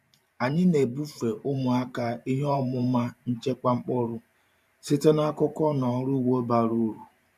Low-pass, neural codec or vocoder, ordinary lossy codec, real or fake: 14.4 kHz; vocoder, 44.1 kHz, 128 mel bands every 512 samples, BigVGAN v2; none; fake